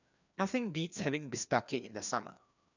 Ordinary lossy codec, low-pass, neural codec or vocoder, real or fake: none; 7.2 kHz; codec, 16 kHz, 2 kbps, FreqCodec, larger model; fake